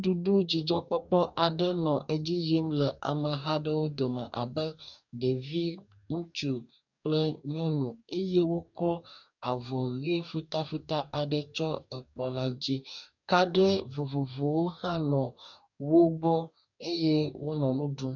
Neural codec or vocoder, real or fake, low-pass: codec, 44.1 kHz, 2.6 kbps, DAC; fake; 7.2 kHz